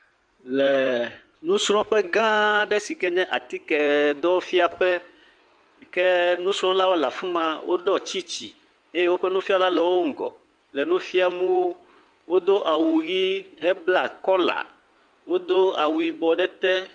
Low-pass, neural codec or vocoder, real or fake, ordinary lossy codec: 9.9 kHz; codec, 16 kHz in and 24 kHz out, 2.2 kbps, FireRedTTS-2 codec; fake; MP3, 96 kbps